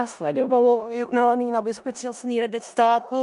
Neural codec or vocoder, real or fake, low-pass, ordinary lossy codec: codec, 16 kHz in and 24 kHz out, 0.4 kbps, LongCat-Audio-Codec, four codebook decoder; fake; 10.8 kHz; MP3, 96 kbps